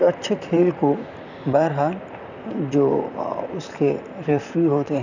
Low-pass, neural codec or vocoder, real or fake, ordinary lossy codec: 7.2 kHz; vocoder, 44.1 kHz, 128 mel bands, Pupu-Vocoder; fake; none